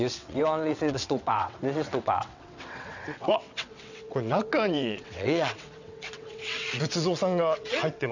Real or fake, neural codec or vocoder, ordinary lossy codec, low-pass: fake; vocoder, 22.05 kHz, 80 mel bands, WaveNeXt; none; 7.2 kHz